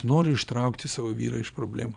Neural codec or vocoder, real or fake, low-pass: vocoder, 22.05 kHz, 80 mel bands, Vocos; fake; 9.9 kHz